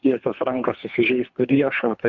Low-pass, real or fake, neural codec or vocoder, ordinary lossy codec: 7.2 kHz; fake; codec, 24 kHz, 3 kbps, HILCodec; MP3, 64 kbps